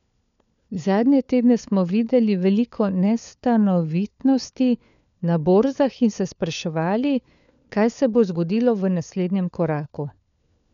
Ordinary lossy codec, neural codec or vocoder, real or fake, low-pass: none; codec, 16 kHz, 4 kbps, FunCodec, trained on LibriTTS, 50 frames a second; fake; 7.2 kHz